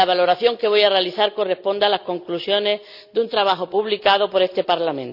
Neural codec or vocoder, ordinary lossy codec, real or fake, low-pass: none; none; real; 5.4 kHz